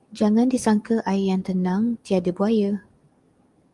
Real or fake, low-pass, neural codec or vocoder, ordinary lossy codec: fake; 10.8 kHz; codec, 44.1 kHz, 7.8 kbps, DAC; Opus, 32 kbps